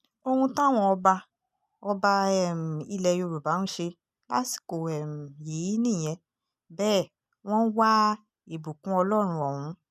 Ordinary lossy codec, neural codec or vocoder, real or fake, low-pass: none; none; real; 14.4 kHz